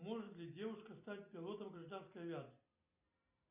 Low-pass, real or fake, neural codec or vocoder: 3.6 kHz; real; none